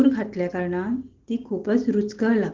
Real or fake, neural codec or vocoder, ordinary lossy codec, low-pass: real; none; Opus, 16 kbps; 7.2 kHz